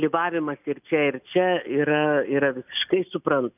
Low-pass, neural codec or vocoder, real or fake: 3.6 kHz; none; real